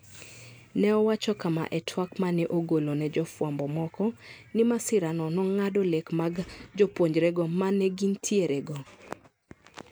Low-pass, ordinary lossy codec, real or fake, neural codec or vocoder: none; none; real; none